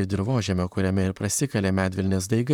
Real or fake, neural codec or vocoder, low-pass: fake; vocoder, 44.1 kHz, 128 mel bands, Pupu-Vocoder; 19.8 kHz